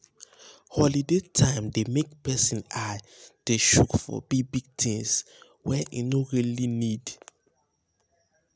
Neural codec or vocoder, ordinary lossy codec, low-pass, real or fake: none; none; none; real